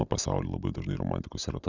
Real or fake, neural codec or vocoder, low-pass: real; none; 7.2 kHz